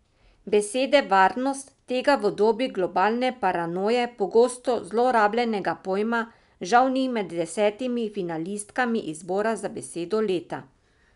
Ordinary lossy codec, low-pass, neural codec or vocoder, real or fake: none; 10.8 kHz; none; real